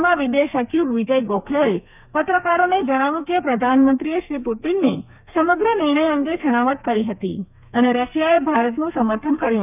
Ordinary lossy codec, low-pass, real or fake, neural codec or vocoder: none; 3.6 kHz; fake; codec, 32 kHz, 1.9 kbps, SNAC